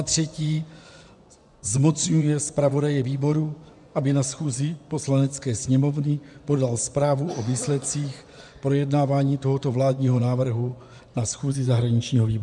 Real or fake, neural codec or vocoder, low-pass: fake; vocoder, 24 kHz, 100 mel bands, Vocos; 10.8 kHz